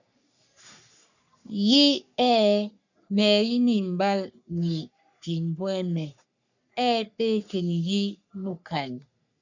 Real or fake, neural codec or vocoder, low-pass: fake; codec, 44.1 kHz, 3.4 kbps, Pupu-Codec; 7.2 kHz